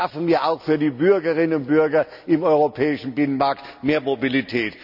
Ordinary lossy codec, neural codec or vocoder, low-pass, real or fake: none; none; 5.4 kHz; real